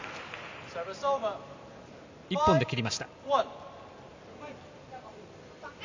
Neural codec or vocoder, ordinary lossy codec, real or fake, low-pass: none; MP3, 64 kbps; real; 7.2 kHz